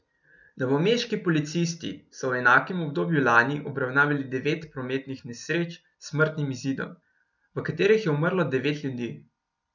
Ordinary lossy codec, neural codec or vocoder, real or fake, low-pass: none; none; real; 7.2 kHz